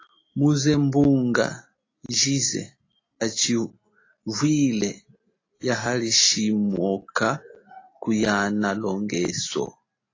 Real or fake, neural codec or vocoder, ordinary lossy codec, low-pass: real; none; AAC, 32 kbps; 7.2 kHz